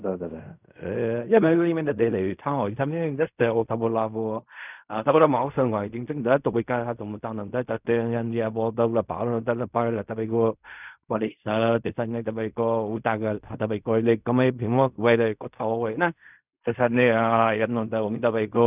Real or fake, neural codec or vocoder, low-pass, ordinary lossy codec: fake; codec, 16 kHz in and 24 kHz out, 0.4 kbps, LongCat-Audio-Codec, fine tuned four codebook decoder; 3.6 kHz; none